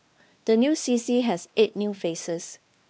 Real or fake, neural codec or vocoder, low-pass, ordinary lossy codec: fake; codec, 16 kHz, 2 kbps, X-Codec, WavLM features, trained on Multilingual LibriSpeech; none; none